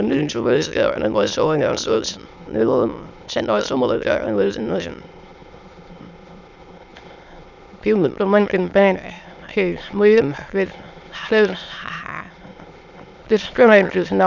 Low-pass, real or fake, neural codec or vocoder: 7.2 kHz; fake; autoencoder, 22.05 kHz, a latent of 192 numbers a frame, VITS, trained on many speakers